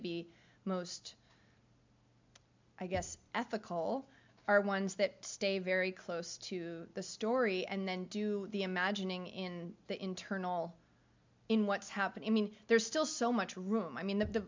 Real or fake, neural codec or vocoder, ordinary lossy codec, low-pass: real; none; MP3, 64 kbps; 7.2 kHz